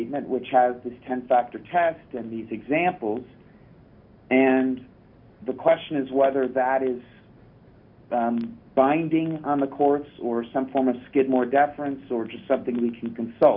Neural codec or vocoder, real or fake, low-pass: none; real; 5.4 kHz